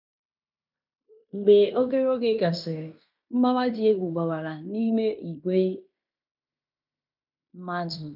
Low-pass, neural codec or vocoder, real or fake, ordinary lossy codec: 5.4 kHz; codec, 16 kHz in and 24 kHz out, 0.9 kbps, LongCat-Audio-Codec, fine tuned four codebook decoder; fake; none